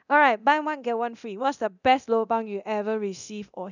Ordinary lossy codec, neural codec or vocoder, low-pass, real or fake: none; codec, 24 kHz, 0.9 kbps, DualCodec; 7.2 kHz; fake